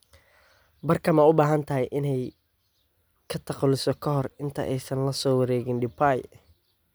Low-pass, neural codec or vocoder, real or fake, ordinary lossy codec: none; none; real; none